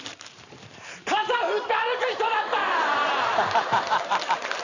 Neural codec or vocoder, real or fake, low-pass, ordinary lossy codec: vocoder, 44.1 kHz, 128 mel bands every 512 samples, BigVGAN v2; fake; 7.2 kHz; none